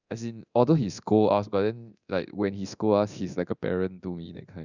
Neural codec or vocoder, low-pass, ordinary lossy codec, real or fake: codec, 24 kHz, 0.9 kbps, DualCodec; 7.2 kHz; none; fake